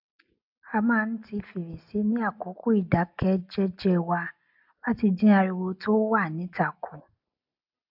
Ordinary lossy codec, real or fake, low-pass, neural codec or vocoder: AAC, 48 kbps; real; 5.4 kHz; none